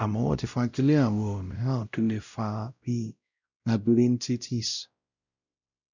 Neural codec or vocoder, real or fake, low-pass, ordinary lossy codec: codec, 16 kHz, 0.5 kbps, X-Codec, WavLM features, trained on Multilingual LibriSpeech; fake; 7.2 kHz; none